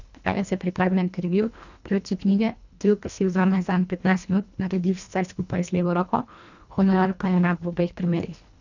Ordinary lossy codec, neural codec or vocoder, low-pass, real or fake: none; codec, 24 kHz, 1.5 kbps, HILCodec; 7.2 kHz; fake